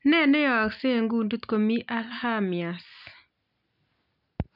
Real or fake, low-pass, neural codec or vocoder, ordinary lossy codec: real; 5.4 kHz; none; none